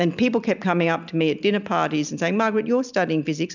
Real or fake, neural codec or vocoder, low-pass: real; none; 7.2 kHz